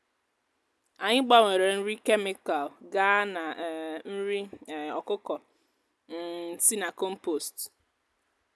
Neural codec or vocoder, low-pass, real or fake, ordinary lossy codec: none; none; real; none